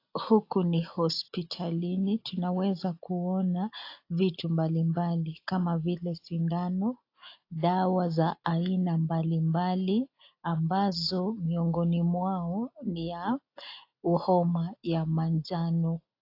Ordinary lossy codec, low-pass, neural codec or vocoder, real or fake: AAC, 32 kbps; 5.4 kHz; none; real